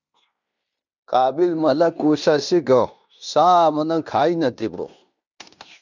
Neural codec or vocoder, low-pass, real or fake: codec, 16 kHz in and 24 kHz out, 0.9 kbps, LongCat-Audio-Codec, fine tuned four codebook decoder; 7.2 kHz; fake